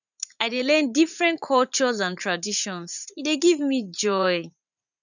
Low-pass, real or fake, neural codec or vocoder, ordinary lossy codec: 7.2 kHz; fake; vocoder, 44.1 kHz, 80 mel bands, Vocos; none